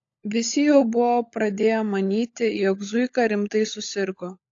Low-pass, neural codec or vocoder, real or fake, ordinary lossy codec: 7.2 kHz; codec, 16 kHz, 16 kbps, FunCodec, trained on LibriTTS, 50 frames a second; fake; AAC, 48 kbps